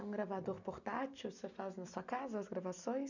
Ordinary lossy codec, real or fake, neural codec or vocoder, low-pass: none; real; none; 7.2 kHz